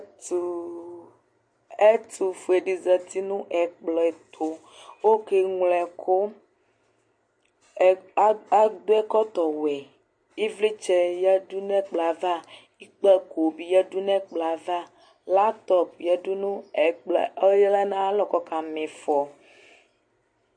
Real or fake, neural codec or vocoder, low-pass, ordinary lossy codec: real; none; 9.9 kHz; MP3, 48 kbps